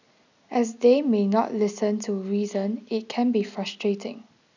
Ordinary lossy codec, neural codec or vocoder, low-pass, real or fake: none; none; 7.2 kHz; real